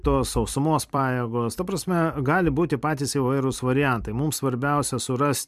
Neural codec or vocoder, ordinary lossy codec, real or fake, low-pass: vocoder, 44.1 kHz, 128 mel bands every 256 samples, BigVGAN v2; MP3, 96 kbps; fake; 14.4 kHz